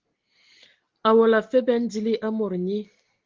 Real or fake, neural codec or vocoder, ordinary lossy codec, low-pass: real; none; Opus, 16 kbps; 7.2 kHz